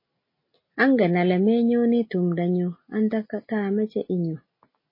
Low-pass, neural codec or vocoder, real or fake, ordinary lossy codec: 5.4 kHz; none; real; MP3, 24 kbps